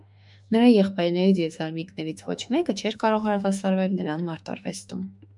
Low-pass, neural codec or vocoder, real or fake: 10.8 kHz; autoencoder, 48 kHz, 32 numbers a frame, DAC-VAE, trained on Japanese speech; fake